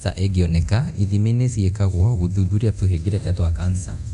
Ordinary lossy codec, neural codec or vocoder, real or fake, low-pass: none; codec, 24 kHz, 0.9 kbps, DualCodec; fake; 10.8 kHz